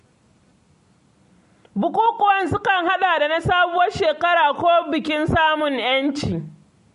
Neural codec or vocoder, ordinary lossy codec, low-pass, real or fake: none; MP3, 48 kbps; 10.8 kHz; real